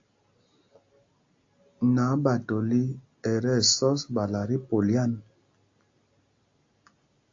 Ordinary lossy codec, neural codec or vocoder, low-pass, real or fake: AAC, 48 kbps; none; 7.2 kHz; real